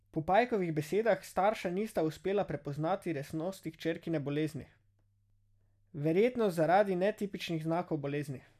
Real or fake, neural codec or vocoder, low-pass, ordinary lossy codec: real; none; 14.4 kHz; none